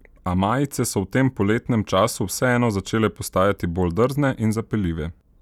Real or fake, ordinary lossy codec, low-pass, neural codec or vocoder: real; none; 19.8 kHz; none